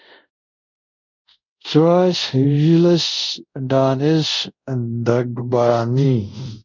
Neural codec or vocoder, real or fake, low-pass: codec, 24 kHz, 0.5 kbps, DualCodec; fake; 7.2 kHz